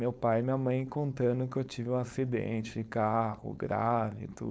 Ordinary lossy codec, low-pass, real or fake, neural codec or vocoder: none; none; fake; codec, 16 kHz, 4.8 kbps, FACodec